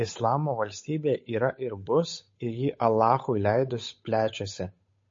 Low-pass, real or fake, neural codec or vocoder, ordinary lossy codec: 7.2 kHz; fake; codec, 16 kHz, 8 kbps, FunCodec, trained on Chinese and English, 25 frames a second; MP3, 32 kbps